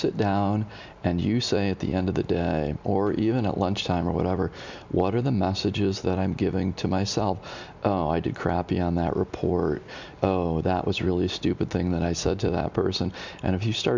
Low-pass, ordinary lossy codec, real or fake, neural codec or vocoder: 7.2 kHz; MP3, 64 kbps; real; none